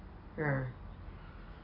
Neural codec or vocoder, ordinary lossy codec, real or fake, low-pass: none; AAC, 48 kbps; real; 5.4 kHz